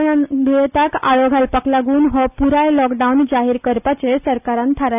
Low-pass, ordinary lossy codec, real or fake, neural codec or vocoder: 3.6 kHz; none; real; none